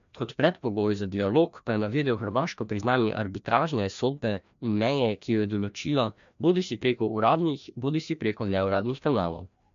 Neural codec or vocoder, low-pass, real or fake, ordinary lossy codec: codec, 16 kHz, 1 kbps, FreqCodec, larger model; 7.2 kHz; fake; MP3, 64 kbps